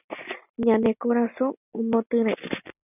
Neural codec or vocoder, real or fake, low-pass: none; real; 3.6 kHz